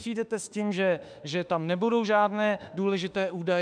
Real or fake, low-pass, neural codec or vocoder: fake; 9.9 kHz; autoencoder, 48 kHz, 32 numbers a frame, DAC-VAE, trained on Japanese speech